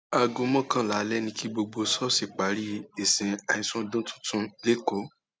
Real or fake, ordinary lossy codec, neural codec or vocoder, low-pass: real; none; none; none